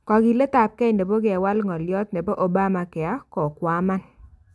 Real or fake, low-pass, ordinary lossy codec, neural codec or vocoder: real; none; none; none